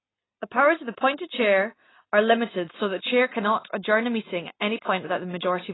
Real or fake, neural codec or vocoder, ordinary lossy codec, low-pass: real; none; AAC, 16 kbps; 7.2 kHz